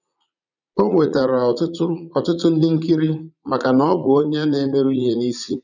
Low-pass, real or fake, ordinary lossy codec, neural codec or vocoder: 7.2 kHz; fake; none; vocoder, 44.1 kHz, 80 mel bands, Vocos